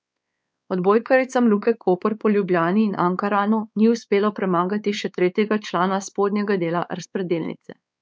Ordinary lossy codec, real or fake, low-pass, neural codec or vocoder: none; fake; none; codec, 16 kHz, 4 kbps, X-Codec, WavLM features, trained on Multilingual LibriSpeech